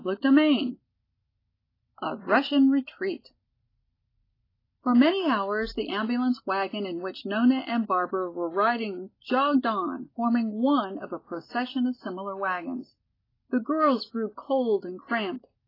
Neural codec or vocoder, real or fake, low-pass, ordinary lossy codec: none; real; 5.4 kHz; AAC, 24 kbps